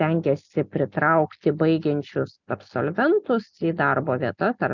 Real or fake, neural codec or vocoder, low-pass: real; none; 7.2 kHz